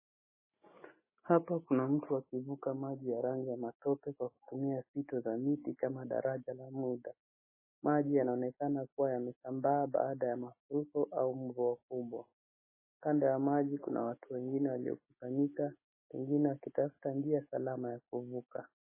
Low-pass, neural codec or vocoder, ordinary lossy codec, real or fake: 3.6 kHz; none; MP3, 16 kbps; real